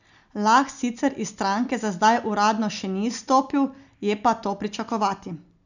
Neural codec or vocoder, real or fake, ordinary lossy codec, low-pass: none; real; none; 7.2 kHz